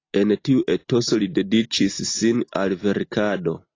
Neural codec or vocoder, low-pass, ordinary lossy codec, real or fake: none; 7.2 kHz; AAC, 32 kbps; real